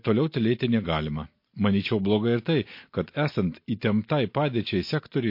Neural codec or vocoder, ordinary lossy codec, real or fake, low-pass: none; MP3, 32 kbps; real; 5.4 kHz